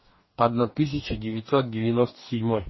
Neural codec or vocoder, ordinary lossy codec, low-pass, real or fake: codec, 44.1 kHz, 2.6 kbps, DAC; MP3, 24 kbps; 7.2 kHz; fake